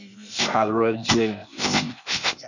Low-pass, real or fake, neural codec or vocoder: 7.2 kHz; fake; codec, 16 kHz, 0.8 kbps, ZipCodec